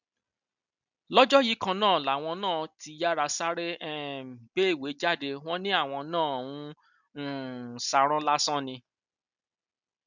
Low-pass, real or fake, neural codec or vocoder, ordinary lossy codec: 7.2 kHz; real; none; none